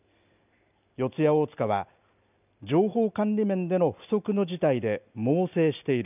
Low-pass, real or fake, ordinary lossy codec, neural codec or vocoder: 3.6 kHz; real; none; none